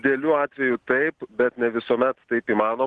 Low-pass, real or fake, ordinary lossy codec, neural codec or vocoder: 10.8 kHz; real; Opus, 16 kbps; none